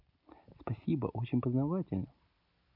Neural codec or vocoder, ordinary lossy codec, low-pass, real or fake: none; none; 5.4 kHz; real